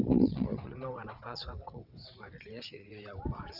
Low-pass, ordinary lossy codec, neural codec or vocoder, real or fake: 5.4 kHz; none; vocoder, 22.05 kHz, 80 mel bands, WaveNeXt; fake